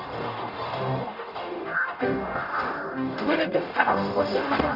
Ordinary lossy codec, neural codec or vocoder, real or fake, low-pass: none; codec, 44.1 kHz, 0.9 kbps, DAC; fake; 5.4 kHz